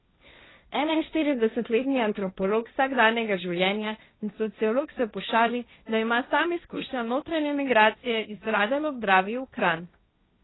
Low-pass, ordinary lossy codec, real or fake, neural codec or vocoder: 7.2 kHz; AAC, 16 kbps; fake; codec, 16 kHz, 1.1 kbps, Voila-Tokenizer